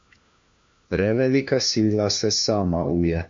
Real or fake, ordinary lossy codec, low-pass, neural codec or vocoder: fake; MP3, 48 kbps; 7.2 kHz; codec, 16 kHz, 1 kbps, FunCodec, trained on LibriTTS, 50 frames a second